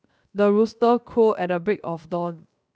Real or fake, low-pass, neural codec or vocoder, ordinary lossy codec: fake; none; codec, 16 kHz, 0.7 kbps, FocalCodec; none